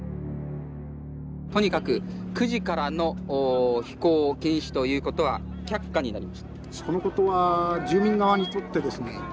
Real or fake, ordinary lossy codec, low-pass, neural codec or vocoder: real; none; none; none